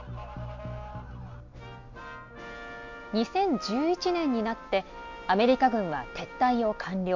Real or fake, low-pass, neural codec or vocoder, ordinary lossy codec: real; 7.2 kHz; none; MP3, 48 kbps